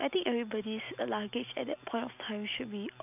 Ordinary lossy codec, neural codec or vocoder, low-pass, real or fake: none; none; 3.6 kHz; real